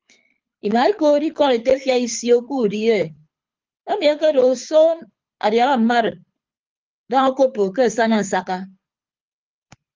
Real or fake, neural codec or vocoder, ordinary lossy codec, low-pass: fake; codec, 24 kHz, 6 kbps, HILCodec; Opus, 24 kbps; 7.2 kHz